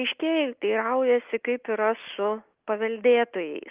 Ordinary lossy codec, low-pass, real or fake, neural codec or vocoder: Opus, 32 kbps; 3.6 kHz; real; none